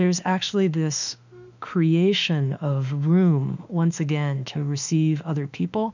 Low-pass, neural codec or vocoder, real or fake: 7.2 kHz; autoencoder, 48 kHz, 32 numbers a frame, DAC-VAE, trained on Japanese speech; fake